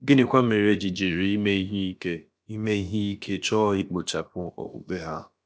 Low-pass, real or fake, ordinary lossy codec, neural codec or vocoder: none; fake; none; codec, 16 kHz, about 1 kbps, DyCAST, with the encoder's durations